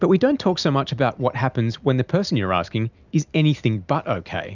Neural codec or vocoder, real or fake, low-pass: none; real; 7.2 kHz